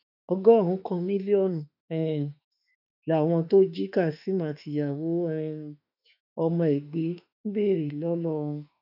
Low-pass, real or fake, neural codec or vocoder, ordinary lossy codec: 5.4 kHz; fake; autoencoder, 48 kHz, 32 numbers a frame, DAC-VAE, trained on Japanese speech; none